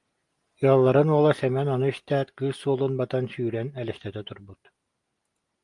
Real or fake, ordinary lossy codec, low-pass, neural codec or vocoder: real; Opus, 32 kbps; 10.8 kHz; none